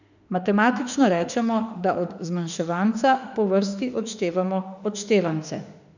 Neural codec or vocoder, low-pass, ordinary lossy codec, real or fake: autoencoder, 48 kHz, 32 numbers a frame, DAC-VAE, trained on Japanese speech; 7.2 kHz; none; fake